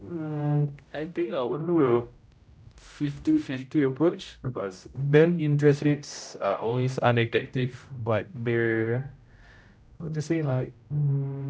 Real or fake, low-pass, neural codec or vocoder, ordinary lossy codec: fake; none; codec, 16 kHz, 0.5 kbps, X-Codec, HuBERT features, trained on general audio; none